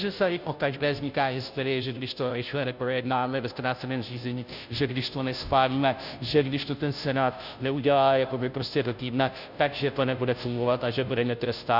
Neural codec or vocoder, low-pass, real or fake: codec, 16 kHz, 0.5 kbps, FunCodec, trained on Chinese and English, 25 frames a second; 5.4 kHz; fake